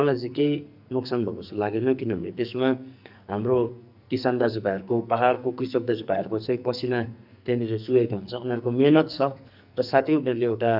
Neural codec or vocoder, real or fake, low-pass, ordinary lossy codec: codec, 44.1 kHz, 2.6 kbps, SNAC; fake; 5.4 kHz; none